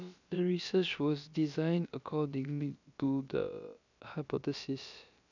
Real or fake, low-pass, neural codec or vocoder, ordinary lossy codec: fake; 7.2 kHz; codec, 16 kHz, about 1 kbps, DyCAST, with the encoder's durations; none